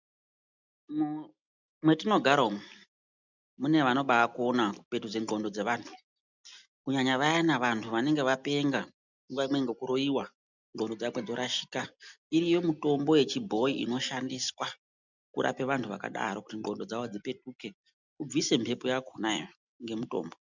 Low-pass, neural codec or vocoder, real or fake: 7.2 kHz; none; real